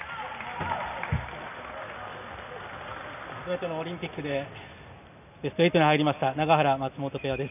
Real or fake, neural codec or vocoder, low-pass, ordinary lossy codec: real; none; 3.6 kHz; none